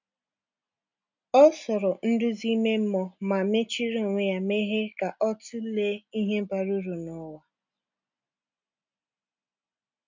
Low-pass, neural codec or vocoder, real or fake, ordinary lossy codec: 7.2 kHz; none; real; none